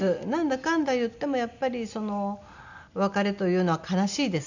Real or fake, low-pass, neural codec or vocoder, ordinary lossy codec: real; 7.2 kHz; none; none